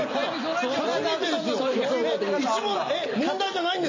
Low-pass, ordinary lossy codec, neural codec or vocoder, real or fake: 7.2 kHz; MP3, 48 kbps; none; real